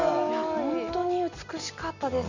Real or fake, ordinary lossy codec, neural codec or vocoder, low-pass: real; none; none; 7.2 kHz